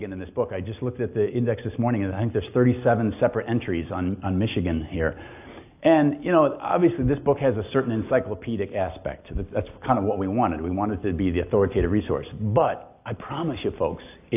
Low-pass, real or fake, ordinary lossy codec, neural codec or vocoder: 3.6 kHz; real; AAC, 32 kbps; none